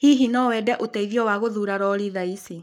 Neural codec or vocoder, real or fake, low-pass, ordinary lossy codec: codec, 44.1 kHz, 7.8 kbps, Pupu-Codec; fake; 19.8 kHz; none